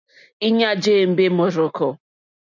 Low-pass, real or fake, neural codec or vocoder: 7.2 kHz; real; none